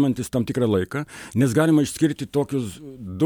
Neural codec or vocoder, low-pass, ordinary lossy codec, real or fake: none; 19.8 kHz; MP3, 96 kbps; real